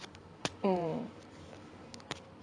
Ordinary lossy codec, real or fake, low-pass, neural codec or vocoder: none; fake; 9.9 kHz; vocoder, 44.1 kHz, 128 mel bands every 512 samples, BigVGAN v2